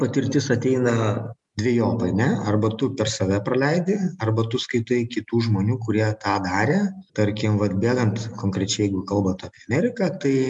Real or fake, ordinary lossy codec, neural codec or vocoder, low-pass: real; MP3, 96 kbps; none; 10.8 kHz